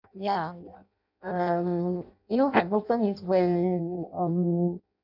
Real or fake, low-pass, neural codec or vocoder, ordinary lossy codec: fake; 5.4 kHz; codec, 16 kHz in and 24 kHz out, 0.6 kbps, FireRedTTS-2 codec; none